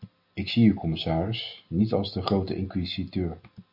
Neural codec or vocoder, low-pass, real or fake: none; 5.4 kHz; real